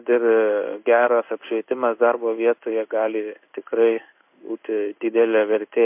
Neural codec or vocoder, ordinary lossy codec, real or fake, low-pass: codec, 16 kHz in and 24 kHz out, 1 kbps, XY-Tokenizer; MP3, 32 kbps; fake; 3.6 kHz